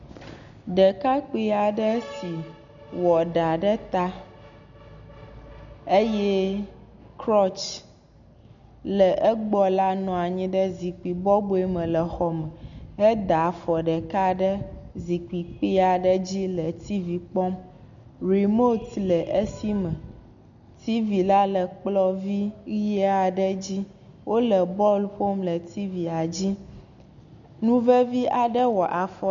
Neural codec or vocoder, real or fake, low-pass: none; real; 7.2 kHz